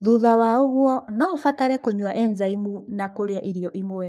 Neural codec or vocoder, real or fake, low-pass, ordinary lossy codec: codec, 44.1 kHz, 3.4 kbps, Pupu-Codec; fake; 14.4 kHz; none